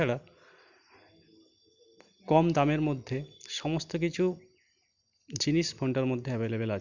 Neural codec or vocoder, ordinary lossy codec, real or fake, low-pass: none; Opus, 64 kbps; real; 7.2 kHz